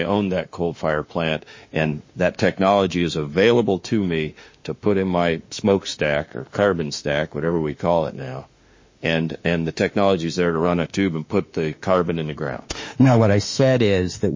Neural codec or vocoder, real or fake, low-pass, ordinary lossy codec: autoencoder, 48 kHz, 32 numbers a frame, DAC-VAE, trained on Japanese speech; fake; 7.2 kHz; MP3, 32 kbps